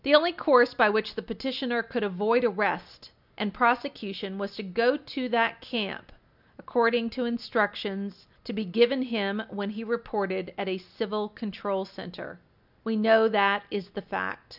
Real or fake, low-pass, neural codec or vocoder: real; 5.4 kHz; none